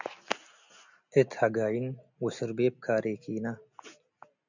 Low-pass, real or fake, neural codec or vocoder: 7.2 kHz; real; none